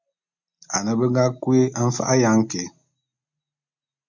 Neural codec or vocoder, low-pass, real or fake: none; 7.2 kHz; real